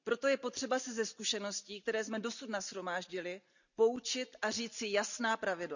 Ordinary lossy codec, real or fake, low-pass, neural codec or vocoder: none; real; 7.2 kHz; none